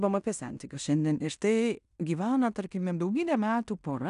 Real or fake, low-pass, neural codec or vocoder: fake; 10.8 kHz; codec, 16 kHz in and 24 kHz out, 0.9 kbps, LongCat-Audio-Codec, fine tuned four codebook decoder